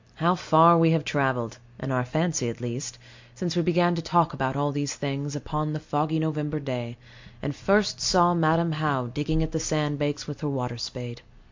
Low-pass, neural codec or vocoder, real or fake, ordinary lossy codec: 7.2 kHz; none; real; MP3, 48 kbps